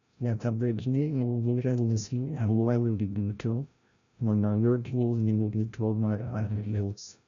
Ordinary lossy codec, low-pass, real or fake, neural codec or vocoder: none; 7.2 kHz; fake; codec, 16 kHz, 0.5 kbps, FreqCodec, larger model